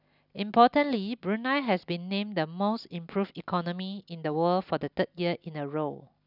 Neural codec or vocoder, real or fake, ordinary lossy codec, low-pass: none; real; none; 5.4 kHz